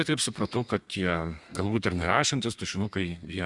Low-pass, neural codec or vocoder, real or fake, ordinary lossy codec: 10.8 kHz; codec, 32 kHz, 1.9 kbps, SNAC; fake; Opus, 64 kbps